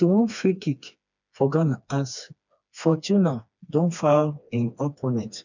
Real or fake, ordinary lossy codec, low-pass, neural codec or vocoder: fake; none; 7.2 kHz; codec, 16 kHz, 2 kbps, FreqCodec, smaller model